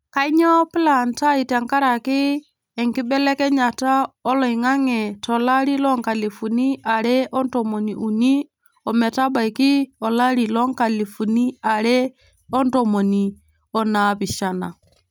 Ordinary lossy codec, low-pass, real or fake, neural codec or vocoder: none; none; real; none